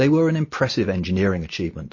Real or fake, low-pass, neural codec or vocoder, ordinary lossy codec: real; 7.2 kHz; none; MP3, 32 kbps